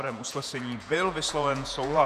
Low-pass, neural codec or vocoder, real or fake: 14.4 kHz; vocoder, 48 kHz, 128 mel bands, Vocos; fake